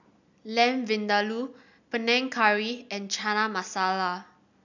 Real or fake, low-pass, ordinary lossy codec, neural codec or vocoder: real; 7.2 kHz; none; none